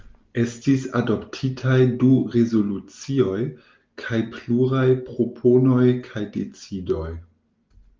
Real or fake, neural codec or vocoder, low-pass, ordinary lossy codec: real; none; 7.2 kHz; Opus, 32 kbps